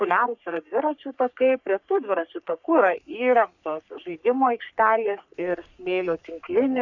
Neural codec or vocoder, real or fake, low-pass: codec, 44.1 kHz, 3.4 kbps, Pupu-Codec; fake; 7.2 kHz